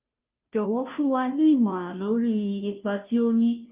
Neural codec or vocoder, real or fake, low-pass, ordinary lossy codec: codec, 16 kHz, 0.5 kbps, FunCodec, trained on Chinese and English, 25 frames a second; fake; 3.6 kHz; Opus, 24 kbps